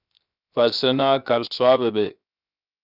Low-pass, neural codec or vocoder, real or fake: 5.4 kHz; codec, 16 kHz, 0.7 kbps, FocalCodec; fake